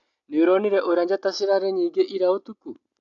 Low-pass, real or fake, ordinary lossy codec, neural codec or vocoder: 7.2 kHz; real; AAC, 64 kbps; none